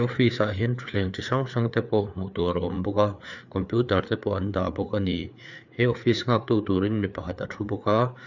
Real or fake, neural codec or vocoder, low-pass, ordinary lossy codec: fake; codec, 16 kHz, 4 kbps, FreqCodec, larger model; 7.2 kHz; none